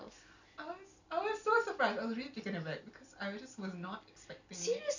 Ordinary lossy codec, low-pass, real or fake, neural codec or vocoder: none; 7.2 kHz; real; none